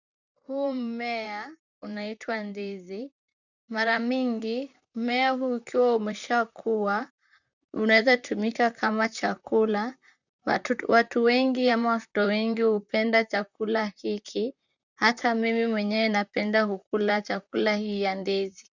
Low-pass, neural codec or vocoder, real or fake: 7.2 kHz; vocoder, 44.1 kHz, 128 mel bands, Pupu-Vocoder; fake